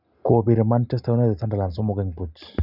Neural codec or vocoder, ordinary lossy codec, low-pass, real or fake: none; none; 5.4 kHz; real